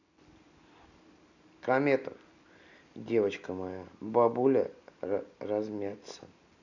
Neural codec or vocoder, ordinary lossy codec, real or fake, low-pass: none; none; real; 7.2 kHz